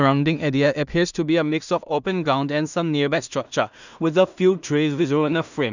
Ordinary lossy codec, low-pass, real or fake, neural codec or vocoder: none; 7.2 kHz; fake; codec, 16 kHz in and 24 kHz out, 0.4 kbps, LongCat-Audio-Codec, two codebook decoder